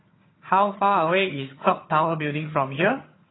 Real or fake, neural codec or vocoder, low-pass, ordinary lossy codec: fake; vocoder, 22.05 kHz, 80 mel bands, HiFi-GAN; 7.2 kHz; AAC, 16 kbps